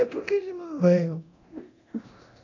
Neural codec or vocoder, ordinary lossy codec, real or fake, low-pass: codec, 24 kHz, 0.9 kbps, DualCodec; AAC, 48 kbps; fake; 7.2 kHz